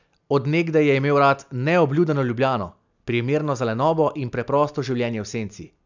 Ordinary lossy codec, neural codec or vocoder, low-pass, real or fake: none; none; 7.2 kHz; real